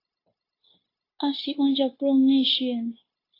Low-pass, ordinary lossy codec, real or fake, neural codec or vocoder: 5.4 kHz; AAC, 32 kbps; fake; codec, 16 kHz, 0.9 kbps, LongCat-Audio-Codec